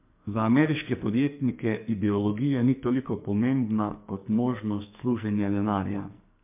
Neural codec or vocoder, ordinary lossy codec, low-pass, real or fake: codec, 44.1 kHz, 2.6 kbps, SNAC; MP3, 32 kbps; 3.6 kHz; fake